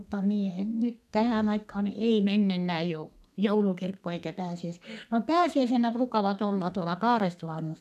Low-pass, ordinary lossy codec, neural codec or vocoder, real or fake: 14.4 kHz; none; codec, 32 kHz, 1.9 kbps, SNAC; fake